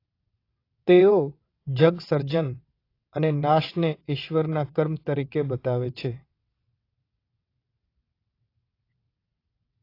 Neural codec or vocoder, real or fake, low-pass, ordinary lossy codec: vocoder, 22.05 kHz, 80 mel bands, WaveNeXt; fake; 5.4 kHz; AAC, 32 kbps